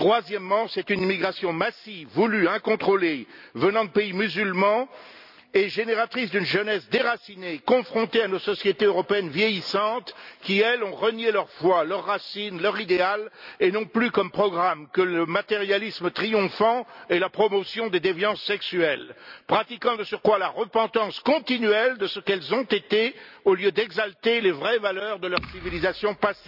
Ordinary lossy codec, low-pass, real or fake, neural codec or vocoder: none; 5.4 kHz; real; none